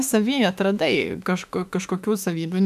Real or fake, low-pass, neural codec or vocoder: fake; 14.4 kHz; autoencoder, 48 kHz, 32 numbers a frame, DAC-VAE, trained on Japanese speech